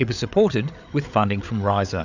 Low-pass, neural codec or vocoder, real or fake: 7.2 kHz; codec, 16 kHz, 8 kbps, FreqCodec, larger model; fake